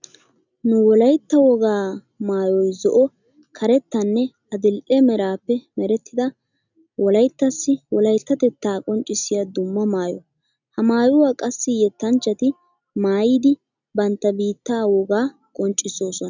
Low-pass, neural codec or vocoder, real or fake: 7.2 kHz; none; real